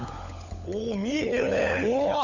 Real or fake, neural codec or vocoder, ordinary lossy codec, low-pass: fake; codec, 16 kHz, 16 kbps, FunCodec, trained on LibriTTS, 50 frames a second; none; 7.2 kHz